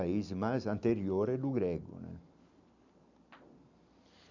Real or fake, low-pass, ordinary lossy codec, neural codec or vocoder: real; 7.2 kHz; none; none